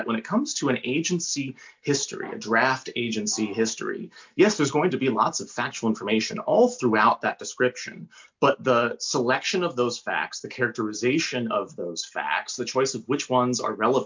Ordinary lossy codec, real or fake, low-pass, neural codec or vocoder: MP3, 64 kbps; real; 7.2 kHz; none